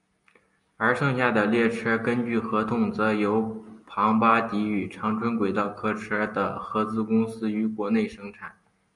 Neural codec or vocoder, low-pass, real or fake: none; 10.8 kHz; real